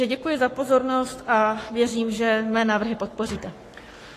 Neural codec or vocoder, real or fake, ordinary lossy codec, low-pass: codec, 44.1 kHz, 7.8 kbps, Pupu-Codec; fake; AAC, 48 kbps; 14.4 kHz